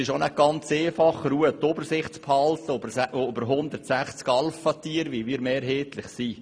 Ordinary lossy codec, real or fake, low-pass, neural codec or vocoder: none; real; none; none